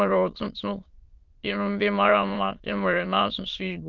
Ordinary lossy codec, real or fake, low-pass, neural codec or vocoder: Opus, 24 kbps; fake; 7.2 kHz; autoencoder, 22.05 kHz, a latent of 192 numbers a frame, VITS, trained on many speakers